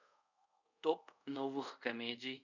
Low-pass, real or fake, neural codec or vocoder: 7.2 kHz; fake; codec, 24 kHz, 0.5 kbps, DualCodec